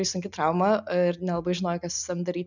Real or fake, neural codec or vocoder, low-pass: real; none; 7.2 kHz